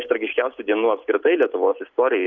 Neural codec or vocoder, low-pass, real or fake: none; 7.2 kHz; real